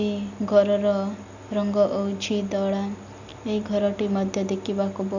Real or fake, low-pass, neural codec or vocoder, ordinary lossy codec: real; 7.2 kHz; none; none